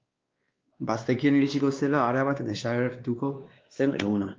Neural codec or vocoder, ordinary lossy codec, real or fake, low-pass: codec, 16 kHz, 2 kbps, X-Codec, WavLM features, trained on Multilingual LibriSpeech; Opus, 24 kbps; fake; 7.2 kHz